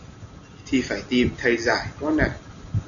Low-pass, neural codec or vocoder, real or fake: 7.2 kHz; none; real